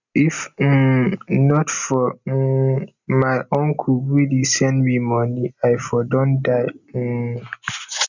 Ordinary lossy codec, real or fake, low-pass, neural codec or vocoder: none; real; 7.2 kHz; none